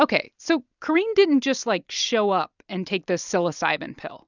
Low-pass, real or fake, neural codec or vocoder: 7.2 kHz; real; none